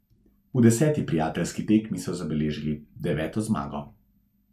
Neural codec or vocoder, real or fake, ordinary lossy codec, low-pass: none; real; none; 14.4 kHz